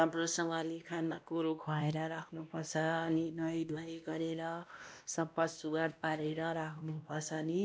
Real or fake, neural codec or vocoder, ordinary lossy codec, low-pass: fake; codec, 16 kHz, 1 kbps, X-Codec, WavLM features, trained on Multilingual LibriSpeech; none; none